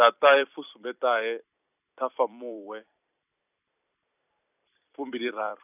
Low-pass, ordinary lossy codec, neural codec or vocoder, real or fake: 3.6 kHz; none; vocoder, 44.1 kHz, 128 mel bands every 256 samples, BigVGAN v2; fake